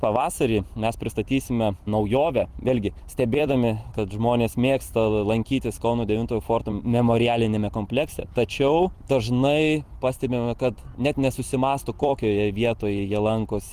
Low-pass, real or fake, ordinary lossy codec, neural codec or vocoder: 14.4 kHz; fake; Opus, 24 kbps; vocoder, 44.1 kHz, 128 mel bands every 256 samples, BigVGAN v2